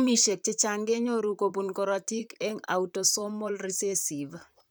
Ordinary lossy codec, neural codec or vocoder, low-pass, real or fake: none; vocoder, 44.1 kHz, 128 mel bands, Pupu-Vocoder; none; fake